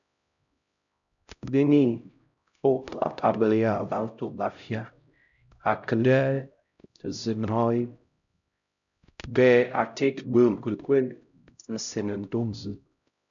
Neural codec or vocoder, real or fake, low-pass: codec, 16 kHz, 0.5 kbps, X-Codec, HuBERT features, trained on LibriSpeech; fake; 7.2 kHz